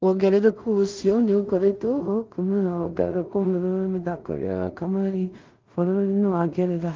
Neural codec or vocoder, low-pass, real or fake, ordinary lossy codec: codec, 16 kHz in and 24 kHz out, 0.4 kbps, LongCat-Audio-Codec, two codebook decoder; 7.2 kHz; fake; Opus, 32 kbps